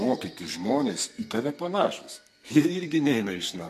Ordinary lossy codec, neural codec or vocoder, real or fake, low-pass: MP3, 64 kbps; codec, 32 kHz, 1.9 kbps, SNAC; fake; 14.4 kHz